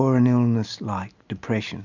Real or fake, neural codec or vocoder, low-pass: real; none; 7.2 kHz